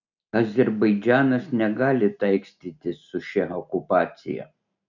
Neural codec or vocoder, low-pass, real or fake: none; 7.2 kHz; real